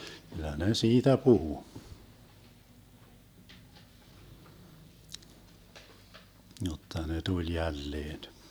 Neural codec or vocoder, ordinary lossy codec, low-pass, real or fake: none; none; none; real